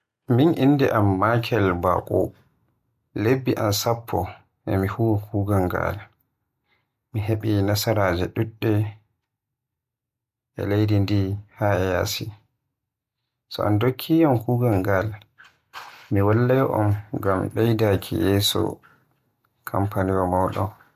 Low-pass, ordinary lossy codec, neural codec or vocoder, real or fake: 14.4 kHz; MP3, 64 kbps; none; real